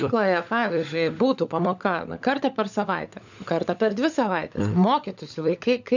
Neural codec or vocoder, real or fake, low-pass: codec, 16 kHz, 16 kbps, FunCodec, trained on LibriTTS, 50 frames a second; fake; 7.2 kHz